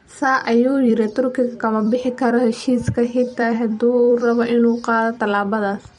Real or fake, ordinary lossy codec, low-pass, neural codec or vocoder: fake; MP3, 48 kbps; 19.8 kHz; vocoder, 44.1 kHz, 128 mel bands every 256 samples, BigVGAN v2